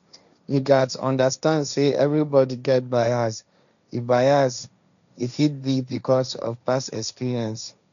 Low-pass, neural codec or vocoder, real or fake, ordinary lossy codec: 7.2 kHz; codec, 16 kHz, 1.1 kbps, Voila-Tokenizer; fake; none